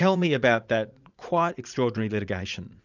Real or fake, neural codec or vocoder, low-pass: fake; vocoder, 44.1 kHz, 80 mel bands, Vocos; 7.2 kHz